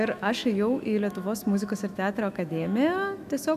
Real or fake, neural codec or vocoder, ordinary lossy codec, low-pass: fake; autoencoder, 48 kHz, 128 numbers a frame, DAC-VAE, trained on Japanese speech; AAC, 96 kbps; 14.4 kHz